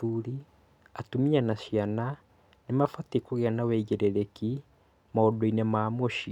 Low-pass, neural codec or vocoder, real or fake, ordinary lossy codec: 19.8 kHz; none; real; none